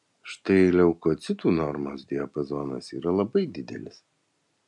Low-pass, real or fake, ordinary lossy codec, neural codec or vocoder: 10.8 kHz; real; MP3, 64 kbps; none